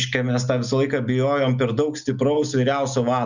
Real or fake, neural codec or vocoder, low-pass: real; none; 7.2 kHz